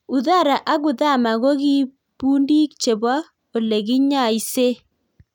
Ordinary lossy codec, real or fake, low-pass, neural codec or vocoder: none; real; 19.8 kHz; none